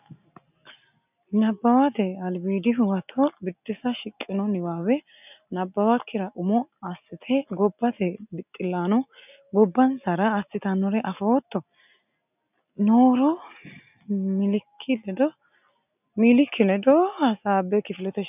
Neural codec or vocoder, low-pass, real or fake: none; 3.6 kHz; real